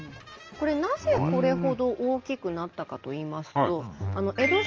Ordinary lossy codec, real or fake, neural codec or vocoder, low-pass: Opus, 24 kbps; real; none; 7.2 kHz